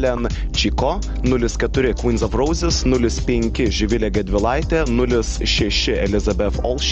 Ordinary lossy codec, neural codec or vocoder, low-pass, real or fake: Opus, 24 kbps; none; 7.2 kHz; real